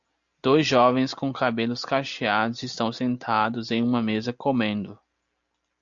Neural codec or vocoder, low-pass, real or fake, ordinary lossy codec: none; 7.2 kHz; real; AAC, 64 kbps